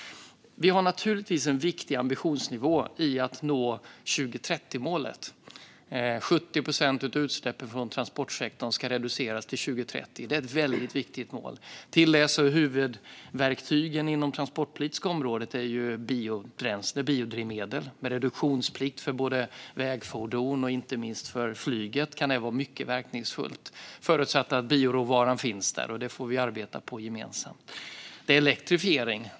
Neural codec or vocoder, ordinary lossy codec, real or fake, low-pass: none; none; real; none